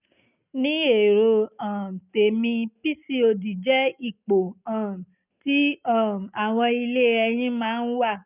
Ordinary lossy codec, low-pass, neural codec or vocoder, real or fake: none; 3.6 kHz; none; real